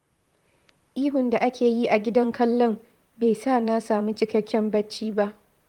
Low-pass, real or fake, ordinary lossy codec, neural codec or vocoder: 19.8 kHz; fake; Opus, 32 kbps; vocoder, 44.1 kHz, 128 mel bands, Pupu-Vocoder